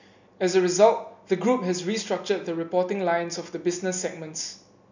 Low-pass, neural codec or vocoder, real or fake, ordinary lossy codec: 7.2 kHz; none; real; none